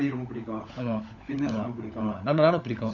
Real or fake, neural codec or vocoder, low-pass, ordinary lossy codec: fake; codec, 16 kHz, 16 kbps, FunCodec, trained on LibriTTS, 50 frames a second; 7.2 kHz; none